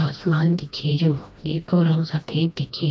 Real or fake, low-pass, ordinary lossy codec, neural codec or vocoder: fake; none; none; codec, 16 kHz, 1 kbps, FreqCodec, smaller model